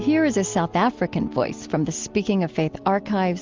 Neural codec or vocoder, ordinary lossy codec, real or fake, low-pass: none; Opus, 32 kbps; real; 7.2 kHz